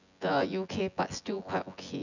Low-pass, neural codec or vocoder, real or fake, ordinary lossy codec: 7.2 kHz; vocoder, 24 kHz, 100 mel bands, Vocos; fake; none